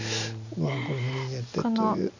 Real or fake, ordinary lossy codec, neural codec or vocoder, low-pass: real; none; none; 7.2 kHz